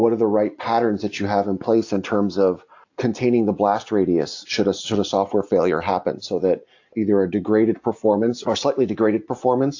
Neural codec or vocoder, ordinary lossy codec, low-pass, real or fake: none; AAC, 48 kbps; 7.2 kHz; real